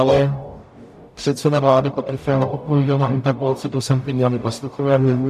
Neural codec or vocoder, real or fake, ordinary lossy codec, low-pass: codec, 44.1 kHz, 0.9 kbps, DAC; fake; MP3, 96 kbps; 14.4 kHz